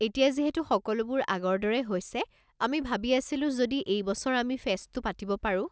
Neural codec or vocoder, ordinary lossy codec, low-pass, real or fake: none; none; none; real